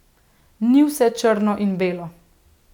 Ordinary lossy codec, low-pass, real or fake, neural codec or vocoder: none; 19.8 kHz; real; none